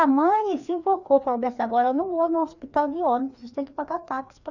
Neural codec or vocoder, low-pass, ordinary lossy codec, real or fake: codec, 16 kHz, 2 kbps, FreqCodec, larger model; 7.2 kHz; none; fake